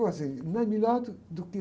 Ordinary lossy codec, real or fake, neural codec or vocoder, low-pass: none; real; none; none